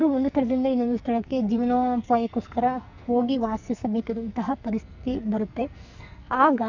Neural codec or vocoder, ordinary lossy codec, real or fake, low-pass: codec, 44.1 kHz, 2.6 kbps, SNAC; none; fake; 7.2 kHz